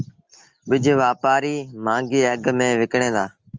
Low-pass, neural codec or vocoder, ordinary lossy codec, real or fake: 7.2 kHz; none; Opus, 24 kbps; real